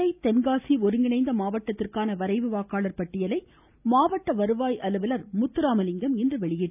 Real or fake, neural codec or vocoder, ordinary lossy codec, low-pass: real; none; none; 3.6 kHz